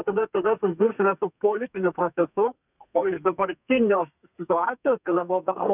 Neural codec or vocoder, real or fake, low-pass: codec, 32 kHz, 1.9 kbps, SNAC; fake; 3.6 kHz